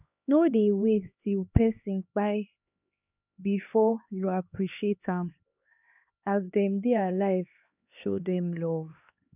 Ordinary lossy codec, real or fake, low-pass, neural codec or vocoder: none; fake; 3.6 kHz; codec, 16 kHz, 2 kbps, X-Codec, HuBERT features, trained on LibriSpeech